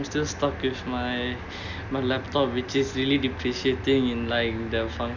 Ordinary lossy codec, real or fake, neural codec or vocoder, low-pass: none; real; none; 7.2 kHz